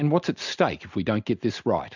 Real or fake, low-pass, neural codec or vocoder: real; 7.2 kHz; none